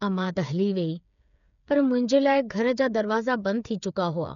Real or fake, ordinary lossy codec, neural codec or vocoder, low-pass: fake; none; codec, 16 kHz, 8 kbps, FreqCodec, smaller model; 7.2 kHz